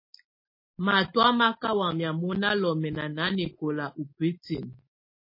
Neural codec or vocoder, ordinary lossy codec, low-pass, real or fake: none; MP3, 24 kbps; 5.4 kHz; real